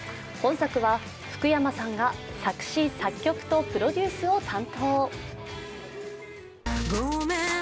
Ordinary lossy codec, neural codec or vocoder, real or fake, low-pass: none; none; real; none